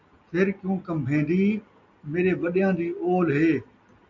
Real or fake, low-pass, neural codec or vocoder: real; 7.2 kHz; none